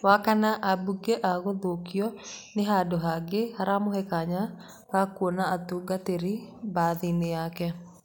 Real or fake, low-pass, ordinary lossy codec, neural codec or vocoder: real; none; none; none